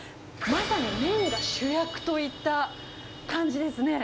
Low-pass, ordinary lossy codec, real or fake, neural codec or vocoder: none; none; real; none